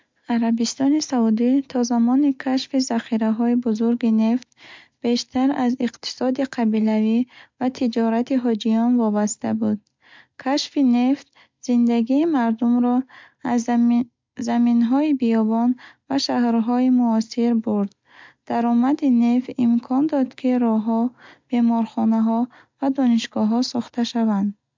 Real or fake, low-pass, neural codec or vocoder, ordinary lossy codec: real; 7.2 kHz; none; MP3, 64 kbps